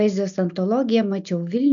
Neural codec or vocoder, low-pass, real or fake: none; 7.2 kHz; real